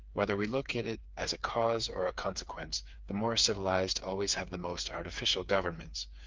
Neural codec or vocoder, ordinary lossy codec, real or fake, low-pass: codec, 16 kHz, 4 kbps, FreqCodec, smaller model; Opus, 32 kbps; fake; 7.2 kHz